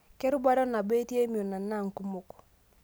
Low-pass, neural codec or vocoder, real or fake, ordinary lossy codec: none; none; real; none